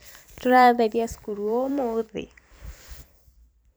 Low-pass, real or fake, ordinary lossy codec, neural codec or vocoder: none; fake; none; vocoder, 44.1 kHz, 128 mel bands every 256 samples, BigVGAN v2